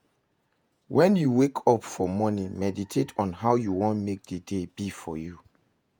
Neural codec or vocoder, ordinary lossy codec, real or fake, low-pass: vocoder, 48 kHz, 128 mel bands, Vocos; none; fake; none